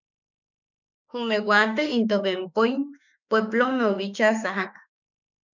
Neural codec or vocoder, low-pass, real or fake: autoencoder, 48 kHz, 32 numbers a frame, DAC-VAE, trained on Japanese speech; 7.2 kHz; fake